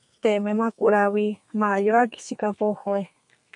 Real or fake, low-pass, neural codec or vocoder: fake; 10.8 kHz; codec, 32 kHz, 1.9 kbps, SNAC